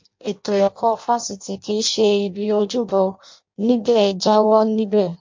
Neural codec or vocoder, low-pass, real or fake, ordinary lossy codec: codec, 16 kHz in and 24 kHz out, 0.6 kbps, FireRedTTS-2 codec; 7.2 kHz; fake; MP3, 48 kbps